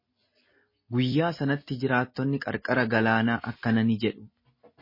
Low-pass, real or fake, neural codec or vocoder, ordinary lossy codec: 5.4 kHz; real; none; MP3, 24 kbps